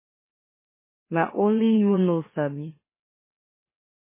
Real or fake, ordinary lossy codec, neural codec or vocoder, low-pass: fake; MP3, 16 kbps; autoencoder, 44.1 kHz, a latent of 192 numbers a frame, MeloTTS; 3.6 kHz